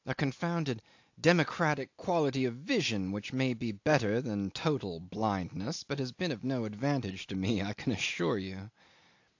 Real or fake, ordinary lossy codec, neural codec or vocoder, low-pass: real; AAC, 48 kbps; none; 7.2 kHz